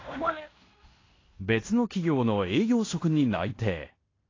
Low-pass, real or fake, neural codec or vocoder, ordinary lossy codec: 7.2 kHz; fake; codec, 16 kHz in and 24 kHz out, 0.9 kbps, LongCat-Audio-Codec, fine tuned four codebook decoder; AAC, 32 kbps